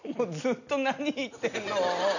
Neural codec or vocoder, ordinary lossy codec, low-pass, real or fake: none; none; 7.2 kHz; real